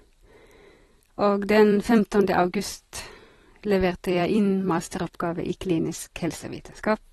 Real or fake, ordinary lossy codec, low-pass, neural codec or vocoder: fake; AAC, 32 kbps; 19.8 kHz; vocoder, 44.1 kHz, 128 mel bands every 256 samples, BigVGAN v2